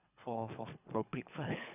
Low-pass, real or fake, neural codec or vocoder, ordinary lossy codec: 3.6 kHz; fake; codec, 24 kHz, 3 kbps, HILCodec; none